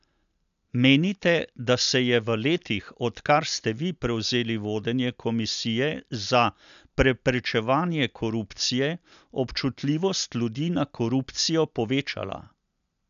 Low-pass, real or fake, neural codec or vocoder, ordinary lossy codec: 7.2 kHz; real; none; none